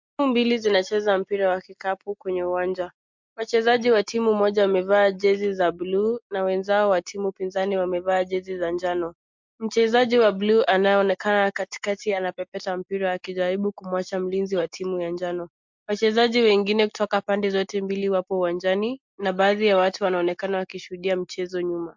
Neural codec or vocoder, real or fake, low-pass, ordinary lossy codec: none; real; 7.2 kHz; MP3, 64 kbps